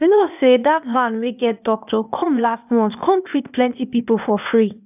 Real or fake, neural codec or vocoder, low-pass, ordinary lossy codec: fake; codec, 16 kHz, 0.8 kbps, ZipCodec; 3.6 kHz; none